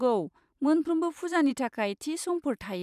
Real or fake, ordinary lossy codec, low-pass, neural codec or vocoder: fake; none; 14.4 kHz; vocoder, 44.1 kHz, 128 mel bands every 512 samples, BigVGAN v2